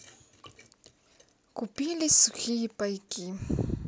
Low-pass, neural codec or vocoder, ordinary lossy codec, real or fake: none; none; none; real